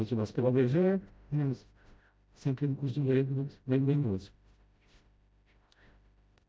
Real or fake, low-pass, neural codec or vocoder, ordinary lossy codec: fake; none; codec, 16 kHz, 0.5 kbps, FreqCodec, smaller model; none